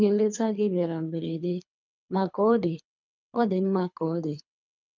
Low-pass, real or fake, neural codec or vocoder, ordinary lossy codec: 7.2 kHz; fake; codec, 24 kHz, 3 kbps, HILCodec; none